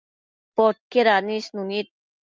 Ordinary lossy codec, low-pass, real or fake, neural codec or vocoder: Opus, 24 kbps; 7.2 kHz; real; none